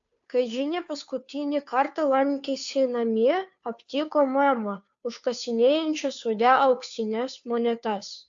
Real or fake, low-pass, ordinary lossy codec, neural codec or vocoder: fake; 7.2 kHz; AAC, 64 kbps; codec, 16 kHz, 2 kbps, FunCodec, trained on Chinese and English, 25 frames a second